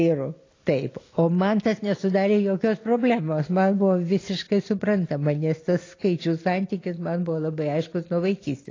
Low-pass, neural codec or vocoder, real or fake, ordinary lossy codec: 7.2 kHz; none; real; AAC, 32 kbps